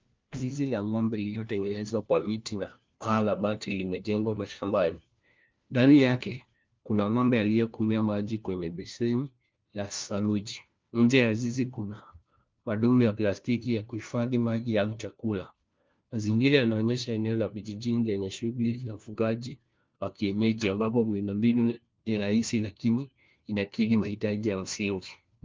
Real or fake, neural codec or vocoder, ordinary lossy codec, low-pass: fake; codec, 16 kHz, 1 kbps, FunCodec, trained on LibriTTS, 50 frames a second; Opus, 24 kbps; 7.2 kHz